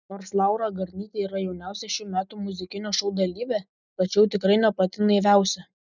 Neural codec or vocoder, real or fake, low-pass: none; real; 7.2 kHz